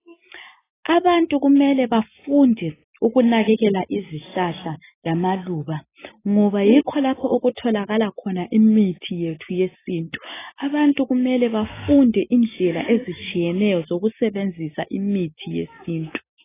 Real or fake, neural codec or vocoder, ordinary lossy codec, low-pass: real; none; AAC, 16 kbps; 3.6 kHz